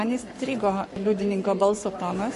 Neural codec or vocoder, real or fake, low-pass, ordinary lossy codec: codec, 44.1 kHz, 7.8 kbps, Pupu-Codec; fake; 14.4 kHz; MP3, 48 kbps